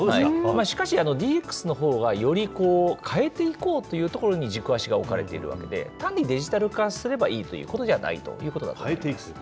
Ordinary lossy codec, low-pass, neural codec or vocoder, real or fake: none; none; none; real